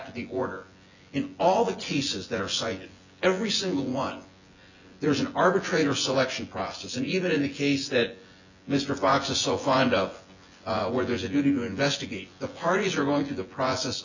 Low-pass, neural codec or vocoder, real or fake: 7.2 kHz; vocoder, 24 kHz, 100 mel bands, Vocos; fake